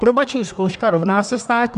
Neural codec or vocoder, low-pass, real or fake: codec, 24 kHz, 1 kbps, SNAC; 10.8 kHz; fake